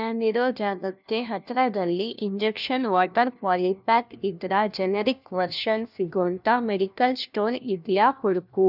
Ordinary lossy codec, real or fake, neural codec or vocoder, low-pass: none; fake; codec, 16 kHz, 1 kbps, FunCodec, trained on LibriTTS, 50 frames a second; 5.4 kHz